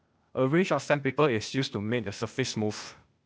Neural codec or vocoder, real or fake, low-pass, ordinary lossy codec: codec, 16 kHz, 0.8 kbps, ZipCodec; fake; none; none